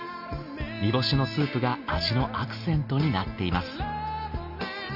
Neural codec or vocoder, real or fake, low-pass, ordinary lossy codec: none; real; 5.4 kHz; none